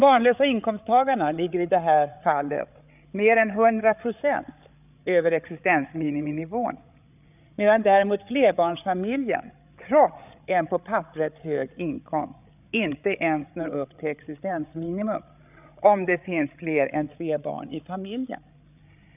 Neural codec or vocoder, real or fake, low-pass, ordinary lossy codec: codec, 16 kHz, 8 kbps, FreqCodec, larger model; fake; 3.6 kHz; none